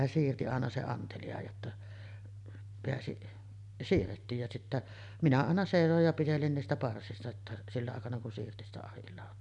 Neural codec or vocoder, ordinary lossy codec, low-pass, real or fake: none; none; 10.8 kHz; real